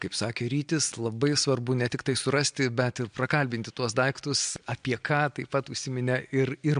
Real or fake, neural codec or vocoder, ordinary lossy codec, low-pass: real; none; MP3, 64 kbps; 9.9 kHz